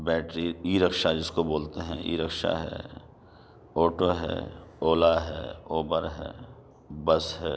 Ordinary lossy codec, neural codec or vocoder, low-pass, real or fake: none; none; none; real